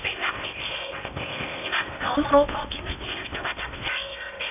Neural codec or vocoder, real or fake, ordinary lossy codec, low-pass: codec, 16 kHz in and 24 kHz out, 0.8 kbps, FocalCodec, streaming, 65536 codes; fake; none; 3.6 kHz